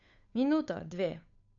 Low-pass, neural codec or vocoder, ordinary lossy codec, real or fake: 7.2 kHz; codec, 16 kHz, 8 kbps, FunCodec, trained on Chinese and English, 25 frames a second; none; fake